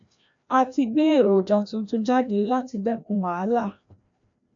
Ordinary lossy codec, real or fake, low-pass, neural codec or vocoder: MP3, 64 kbps; fake; 7.2 kHz; codec, 16 kHz, 1 kbps, FreqCodec, larger model